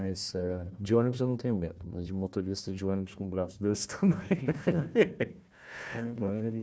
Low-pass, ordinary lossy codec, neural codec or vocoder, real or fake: none; none; codec, 16 kHz, 1 kbps, FunCodec, trained on Chinese and English, 50 frames a second; fake